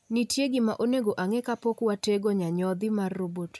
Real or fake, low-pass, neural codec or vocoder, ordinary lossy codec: real; none; none; none